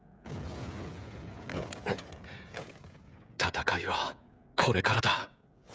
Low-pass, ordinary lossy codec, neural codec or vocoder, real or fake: none; none; codec, 16 kHz, 16 kbps, FreqCodec, smaller model; fake